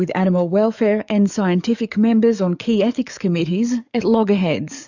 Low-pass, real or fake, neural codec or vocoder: 7.2 kHz; fake; codec, 44.1 kHz, 7.8 kbps, DAC